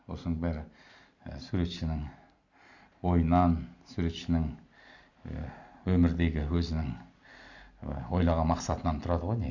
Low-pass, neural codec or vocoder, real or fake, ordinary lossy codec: 7.2 kHz; none; real; none